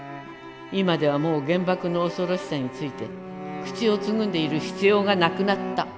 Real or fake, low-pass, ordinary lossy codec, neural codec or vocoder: real; none; none; none